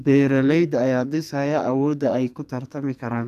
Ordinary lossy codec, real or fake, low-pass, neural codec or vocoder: none; fake; 14.4 kHz; codec, 44.1 kHz, 2.6 kbps, SNAC